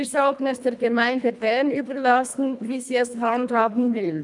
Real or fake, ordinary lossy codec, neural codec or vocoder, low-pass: fake; none; codec, 24 kHz, 1.5 kbps, HILCodec; 10.8 kHz